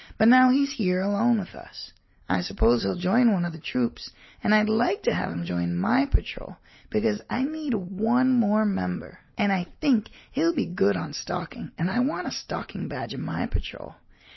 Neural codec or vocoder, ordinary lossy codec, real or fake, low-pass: none; MP3, 24 kbps; real; 7.2 kHz